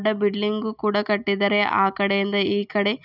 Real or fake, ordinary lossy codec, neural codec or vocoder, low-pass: real; none; none; 5.4 kHz